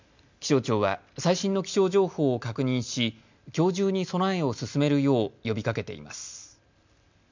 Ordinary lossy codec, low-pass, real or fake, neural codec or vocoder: MP3, 64 kbps; 7.2 kHz; real; none